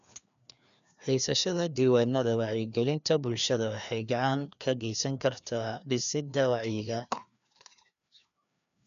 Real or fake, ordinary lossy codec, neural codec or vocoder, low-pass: fake; none; codec, 16 kHz, 2 kbps, FreqCodec, larger model; 7.2 kHz